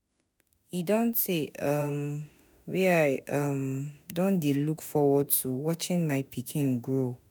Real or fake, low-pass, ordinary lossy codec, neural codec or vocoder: fake; none; none; autoencoder, 48 kHz, 32 numbers a frame, DAC-VAE, trained on Japanese speech